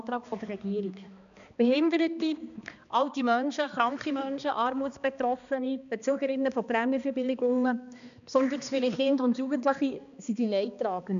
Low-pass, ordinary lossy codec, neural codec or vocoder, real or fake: 7.2 kHz; none; codec, 16 kHz, 2 kbps, X-Codec, HuBERT features, trained on balanced general audio; fake